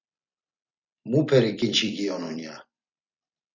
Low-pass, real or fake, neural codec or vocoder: 7.2 kHz; fake; vocoder, 44.1 kHz, 128 mel bands every 256 samples, BigVGAN v2